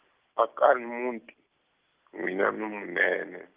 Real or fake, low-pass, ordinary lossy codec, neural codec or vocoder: real; 3.6 kHz; Opus, 24 kbps; none